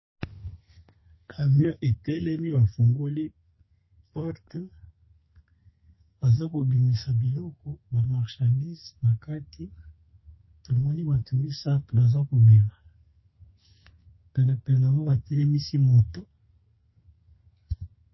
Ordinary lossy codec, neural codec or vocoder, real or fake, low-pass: MP3, 24 kbps; codec, 32 kHz, 1.9 kbps, SNAC; fake; 7.2 kHz